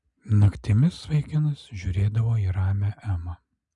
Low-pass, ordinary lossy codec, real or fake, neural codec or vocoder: 10.8 kHz; MP3, 96 kbps; real; none